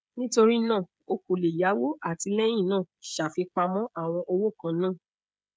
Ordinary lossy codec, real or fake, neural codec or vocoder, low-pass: none; fake; codec, 16 kHz, 16 kbps, FreqCodec, smaller model; none